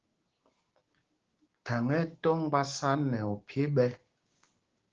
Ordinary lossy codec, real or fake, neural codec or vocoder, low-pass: Opus, 16 kbps; fake; codec, 16 kHz, 6 kbps, DAC; 7.2 kHz